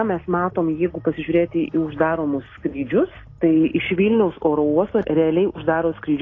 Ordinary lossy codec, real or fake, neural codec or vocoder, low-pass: AAC, 32 kbps; real; none; 7.2 kHz